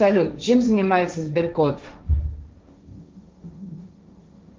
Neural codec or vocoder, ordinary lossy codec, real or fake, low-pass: codec, 16 kHz, 1.1 kbps, Voila-Tokenizer; Opus, 24 kbps; fake; 7.2 kHz